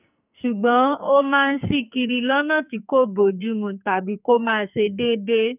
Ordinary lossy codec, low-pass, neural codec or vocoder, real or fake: none; 3.6 kHz; codec, 44.1 kHz, 2.6 kbps, SNAC; fake